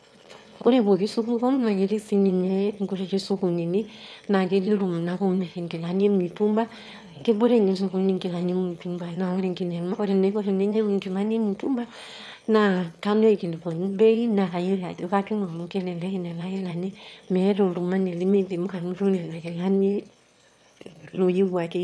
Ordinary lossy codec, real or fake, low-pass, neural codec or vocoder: none; fake; none; autoencoder, 22.05 kHz, a latent of 192 numbers a frame, VITS, trained on one speaker